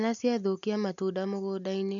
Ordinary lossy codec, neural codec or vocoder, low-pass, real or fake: none; none; 7.2 kHz; real